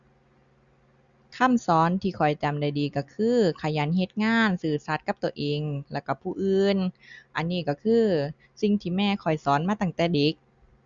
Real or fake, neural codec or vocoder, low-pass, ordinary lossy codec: real; none; 7.2 kHz; Opus, 64 kbps